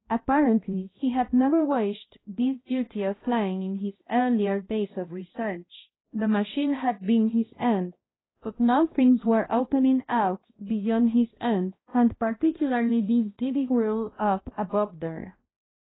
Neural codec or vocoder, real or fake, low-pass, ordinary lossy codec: codec, 16 kHz, 1 kbps, X-Codec, HuBERT features, trained on balanced general audio; fake; 7.2 kHz; AAC, 16 kbps